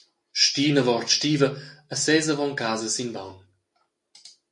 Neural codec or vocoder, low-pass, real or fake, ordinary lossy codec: none; 10.8 kHz; real; MP3, 48 kbps